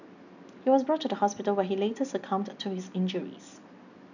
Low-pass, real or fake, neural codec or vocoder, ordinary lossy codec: 7.2 kHz; real; none; none